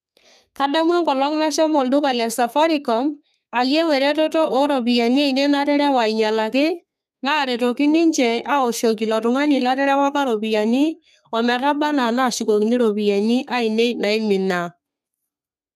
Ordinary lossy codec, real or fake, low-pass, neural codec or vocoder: none; fake; 14.4 kHz; codec, 32 kHz, 1.9 kbps, SNAC